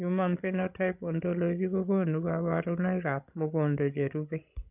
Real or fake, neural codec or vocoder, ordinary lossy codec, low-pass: fake; codec, 16 kHz, 16 kbps, FreqCodec, larger model; none; 3.6 kHz